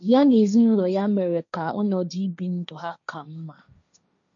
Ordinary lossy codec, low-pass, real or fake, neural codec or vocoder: none; 7.2 kHz; fake; codec, 16 kHz, 1.1 kbps, Voila-Tokenizer